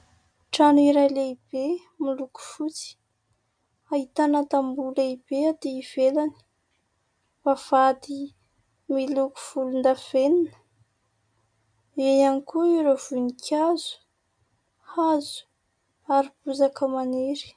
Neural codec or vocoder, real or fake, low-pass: none; real; 9.9 kHz